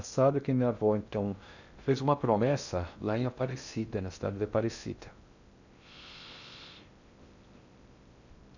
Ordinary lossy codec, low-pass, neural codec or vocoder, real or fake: none; 7.2 kHz; codec, 16 kHz in and 24 kHz out, 0.6 kbps, FocalCodec, streaming, 2048 codes; fake